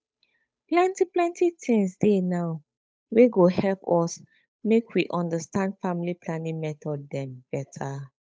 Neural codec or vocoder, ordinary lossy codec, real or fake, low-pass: codec, 16 kHz, 8 kbps, FunCodec, trained on Chinese and English, 25 frames a second; none; fake; none